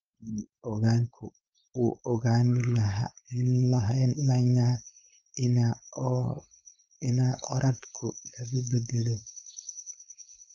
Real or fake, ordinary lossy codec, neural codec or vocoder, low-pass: fake; Opus, 24 kbps; codec, 16 kHz, 4.8 kbps, FACodec; 7.2 kHz